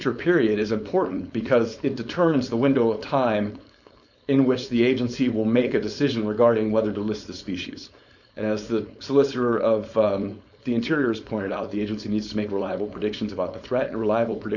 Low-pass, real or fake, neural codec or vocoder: 7.2 kHz; fake; codec, 16 kHz, 4.8 kbps, FACodec